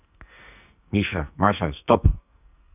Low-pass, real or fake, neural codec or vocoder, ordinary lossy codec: 3.6 kHz; fake; codec, 44.1 kHz, 2.6 kbps, SNAC; none